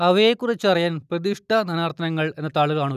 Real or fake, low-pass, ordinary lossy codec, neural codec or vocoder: real; 14.4 kHz; none; none